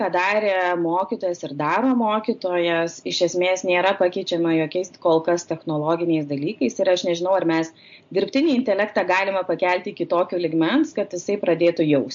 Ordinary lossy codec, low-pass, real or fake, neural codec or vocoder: MP3, 48 kbps; 7.2 kHz; real; none